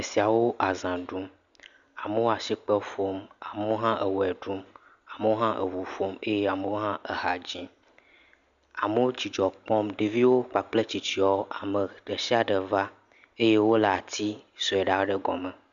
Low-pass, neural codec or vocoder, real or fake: 7.2 kHz; none; real